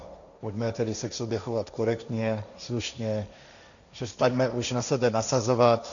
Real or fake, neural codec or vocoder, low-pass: fake; codec, 16 kHz, 1.1 kbps, Voila-Tokenizer; 7.2 kHz